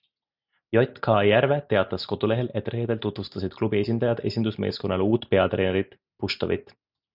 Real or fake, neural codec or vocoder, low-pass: real; none; 5.4 kHz